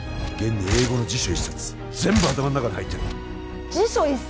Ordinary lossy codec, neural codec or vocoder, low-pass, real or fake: none; none; none; real